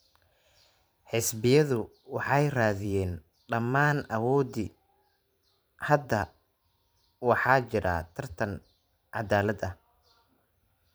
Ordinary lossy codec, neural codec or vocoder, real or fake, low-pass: none; vocoder, 44.1 kHz, 128 mel bands every 512 samples, BigVGAN v2; fake; none